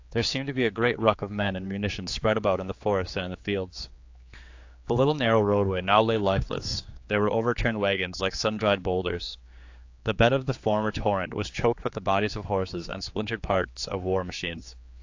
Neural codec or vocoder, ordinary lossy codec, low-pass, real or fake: codec, 16 kHz, 4 kbps, X-Codec, HuBERT features, trained on general audio; AAC, 48 kbps; 7.2 kHz; fake